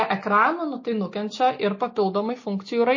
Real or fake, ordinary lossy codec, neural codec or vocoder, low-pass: real; MP3, 32 kbps; none; 7.2 kHz